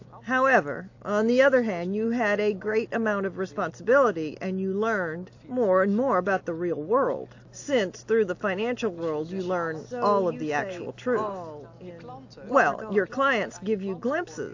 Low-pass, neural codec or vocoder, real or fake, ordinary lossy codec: 7.2 kHz; none; real; AAC, 48 kbps